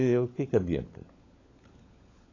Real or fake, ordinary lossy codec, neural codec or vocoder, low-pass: fake; AAC, 32 kbps; codec, 16 kHz, 16 kbps, FunCodec, trained on LibriTTS, 50 frames a second; 7.2 kHz